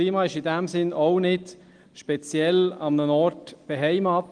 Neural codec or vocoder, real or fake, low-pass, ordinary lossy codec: none; real; 9.9 kHz; Opus, 24 kbps